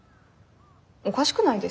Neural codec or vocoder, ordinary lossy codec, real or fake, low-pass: none; none; real; none